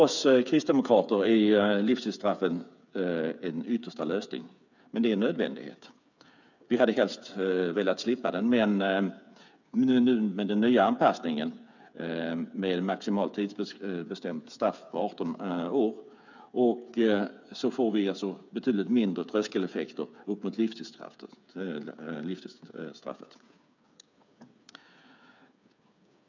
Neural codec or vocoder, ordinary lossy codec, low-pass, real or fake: codec, 16 kHz, 8 kbps, FreqCodec, smaller model; none; 7.2 kHz; fake